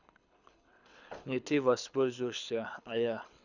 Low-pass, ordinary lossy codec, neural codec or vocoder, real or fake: 7.2 kHz; none; codec, 24 kHz, 6 kbps, HILCodec; fake